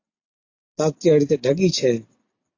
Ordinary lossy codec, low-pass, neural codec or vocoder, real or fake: AAC, 48 kbps; 7.2 kHz; none; real